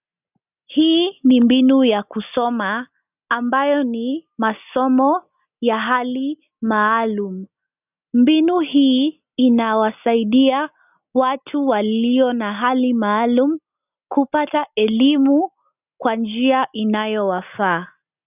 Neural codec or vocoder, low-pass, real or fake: none; 3.6 kHz; real